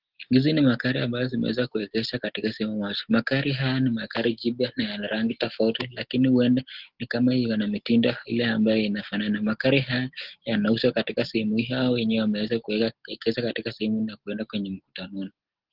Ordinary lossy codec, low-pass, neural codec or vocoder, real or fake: Opus, 16 kbps; 5.4 kHz; none; real